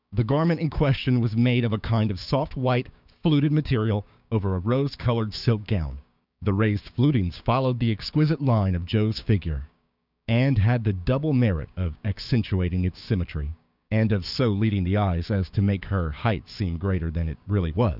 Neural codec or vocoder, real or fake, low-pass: codec, 16 kHz, 6 kbps, DAC; fake; 5.4 kHz